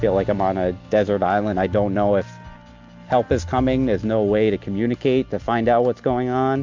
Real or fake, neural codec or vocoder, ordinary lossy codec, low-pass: real; none; MP3, 64 kbps; 7.2 kHz